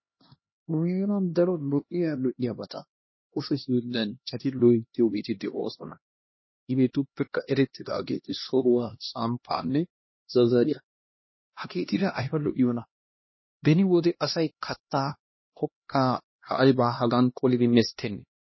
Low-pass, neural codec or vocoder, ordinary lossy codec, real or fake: 7.2 kHz; codec, 16 kHz, 1 kbps, X-Codec, HuBERT features, trained on LibriSpeech; MP3, 24 kbps; fake